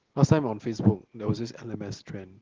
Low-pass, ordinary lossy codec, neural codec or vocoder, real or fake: 7.2 kHz; Opus, 16 kbps; none; real